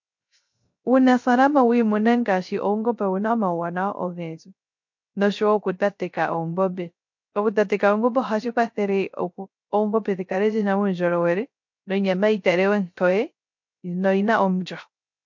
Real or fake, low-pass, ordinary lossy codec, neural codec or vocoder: fake; 7.2 kHz; MP3, 48 kbps; codec, 16 kHz, 0.3 kbps, FocalCodec